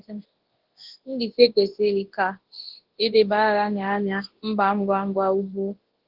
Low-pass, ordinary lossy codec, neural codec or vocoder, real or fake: 5.4 kHz; Opus, 16 kbps; codec, 24 kHz, 0.9 kbps, WavTokenizer, large speech release; fake